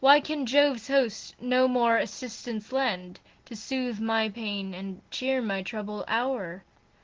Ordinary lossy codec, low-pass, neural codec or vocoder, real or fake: Opus, 16 kbps; 7.2 kHz; none; real